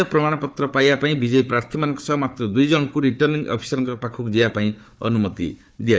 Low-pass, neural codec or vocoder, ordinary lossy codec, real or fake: none; codec, 16 kHz, 4 kbps, FunCodec, trained on Chinese and English, 50 frames a second; none; fake